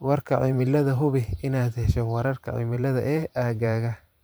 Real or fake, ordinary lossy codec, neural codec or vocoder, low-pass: fake; none; vocoder, 44.1 kHz, 128 mel bands every 512 samples, BigVGAN v2; none